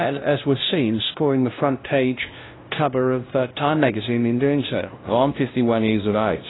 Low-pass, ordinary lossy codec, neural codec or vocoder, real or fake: 7.2 kHz; AAC, 16 kbps; codec, 16 kHz, 0.5 kbps, FunCodec, trained on LibriTTS, 25 frames a second; fake